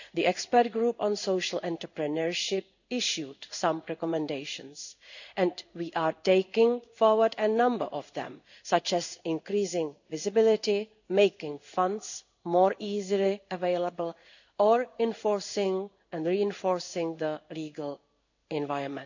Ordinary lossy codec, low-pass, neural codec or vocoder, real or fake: none; 7.2 kHz; codec, 16 kHz in and 24 kHz out, 1 kbps, XY-Tokenizer; fake